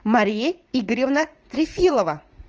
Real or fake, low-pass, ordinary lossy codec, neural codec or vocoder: fake; 7.2 kHz; Opus, 24 kbps; vocoder, 44.1 kHz, 80 mel bands, Vocos